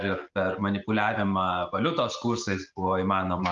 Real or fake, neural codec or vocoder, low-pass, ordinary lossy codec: real; none; 7.2 kHz; Opus, 32 kbps